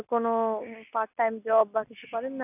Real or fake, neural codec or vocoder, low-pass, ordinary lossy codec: real; none; 3.6 kHz; none